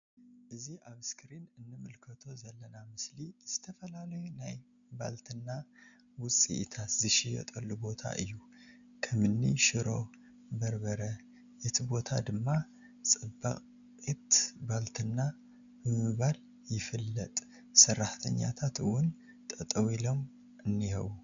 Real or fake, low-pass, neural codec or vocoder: real; 7.2 kHz; none